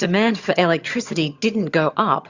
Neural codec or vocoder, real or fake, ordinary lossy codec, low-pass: vocoder, 22.05 kHz, 80 mel bands, HiFi-GAN; fake; Opus, 64 kbps; 7.2 kHz